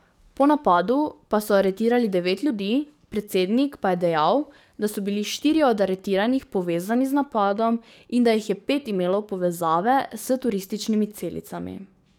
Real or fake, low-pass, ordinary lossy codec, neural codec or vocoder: fake; 19.8 kHz; none; codec, 44.1 kHz, 7.8 kbps, DAC